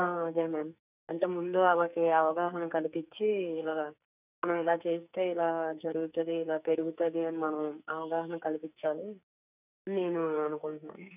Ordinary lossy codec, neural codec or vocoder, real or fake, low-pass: none; codec, 44.1 kHz, 2.6 kbps, SNAC; fake; 3.6 kHz